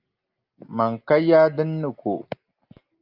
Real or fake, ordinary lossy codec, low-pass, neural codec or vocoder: real; Opus, 32 kbps; 5.4 kHz; none